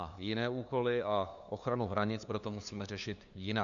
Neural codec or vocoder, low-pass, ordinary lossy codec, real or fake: codec, 16 kHz, 2 kbps, FunCodec, trained on LibriTTS, 25 frames a second; 7.2 kHz; MP3, 96 kbps; fake